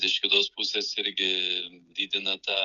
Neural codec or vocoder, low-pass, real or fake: codec, 16 kHz, 16 kbps, FreqCodec, smaller model; 7.2 kHz; fake